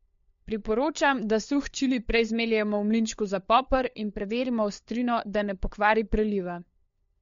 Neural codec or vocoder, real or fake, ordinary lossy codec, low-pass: codec, 16 kHz, 4 kbps, FunCodec, trained on LibriTTS, 50 frames a second; fake; MP3, 48 kbps; 7.2 kHz